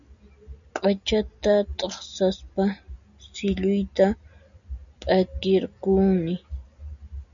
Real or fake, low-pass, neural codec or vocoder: real; 7.2 kHz; none